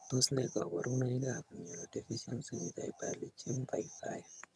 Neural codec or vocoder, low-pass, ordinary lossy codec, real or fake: vocoder, 22.05 kHz, 80 mel bands, HiFi-GAN; none; none; fake